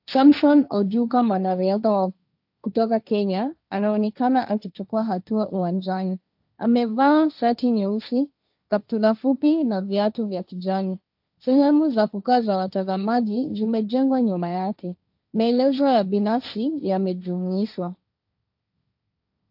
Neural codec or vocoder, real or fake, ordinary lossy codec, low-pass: codec, 16 kHz, 1.1 kbps, Voila-Tokenizer; fake; AAC, 48 kbps; 5.4 kHz